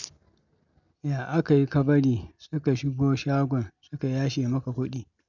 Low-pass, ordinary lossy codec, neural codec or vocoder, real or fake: 7.2 kHz; none; none; real